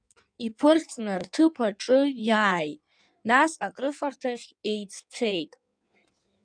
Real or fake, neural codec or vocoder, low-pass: fake; codec, 16 kHz in and 24 kHz out, 1.1 kbps, FireRedTTS-2 codec; 9.9 kHz